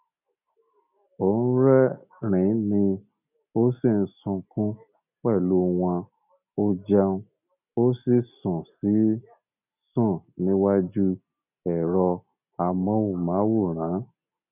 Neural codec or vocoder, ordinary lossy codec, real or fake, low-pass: none; none; real; 3.6 kHz